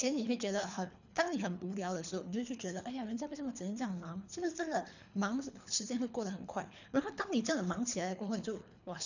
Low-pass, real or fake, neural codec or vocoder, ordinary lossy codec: 7.2 kHz; fake; codec, 24 kHz, 3 kbps, HILCodec; none